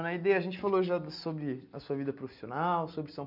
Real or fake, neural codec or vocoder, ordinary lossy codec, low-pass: real; none; none; 5.4 kHz